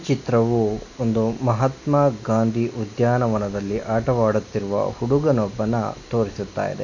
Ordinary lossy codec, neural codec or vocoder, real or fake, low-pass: none; none; real; 7.2 kHz